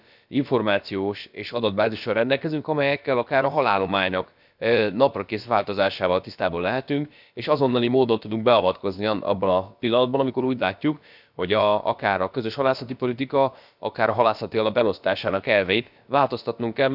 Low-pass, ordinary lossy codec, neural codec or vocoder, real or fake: 5.4 kHz; none; codec, 16 kHz, about 1 kbps, DyCAST, with the encoder's durations; fake